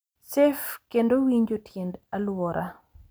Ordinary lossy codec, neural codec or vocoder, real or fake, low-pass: none; none; real; none